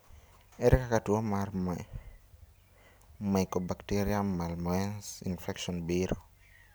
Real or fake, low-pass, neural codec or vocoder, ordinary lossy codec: real; none; none; none